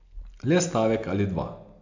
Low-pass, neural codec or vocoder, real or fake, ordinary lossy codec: 7.2 kHz; none; real; AAC, 48 kbps